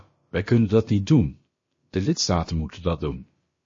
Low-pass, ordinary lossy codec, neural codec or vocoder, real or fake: 7.2 kHz; MP3, 32 kbps; codec, 16 kHz, about 1 kbps, DyCAST, with the encoder's durations; fake